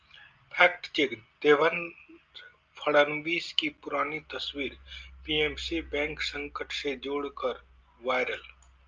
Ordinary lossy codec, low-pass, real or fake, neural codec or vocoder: Opus, 24 kbps; 7.2 kHz; real; none